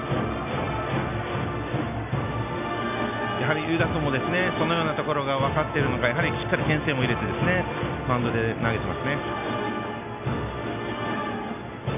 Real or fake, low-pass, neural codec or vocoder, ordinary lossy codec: real; 3.6 kHz; none; none